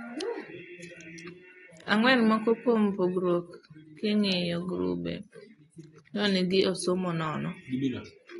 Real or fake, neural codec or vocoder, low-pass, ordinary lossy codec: real; none; 10.8 kHz; AAC, 32 kbps